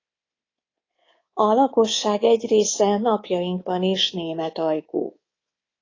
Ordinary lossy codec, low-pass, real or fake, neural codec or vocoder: AAC, 32 kbps; 7.2 kHz; fake; codec, 24 kHz, 3.1 kbps, DualCodec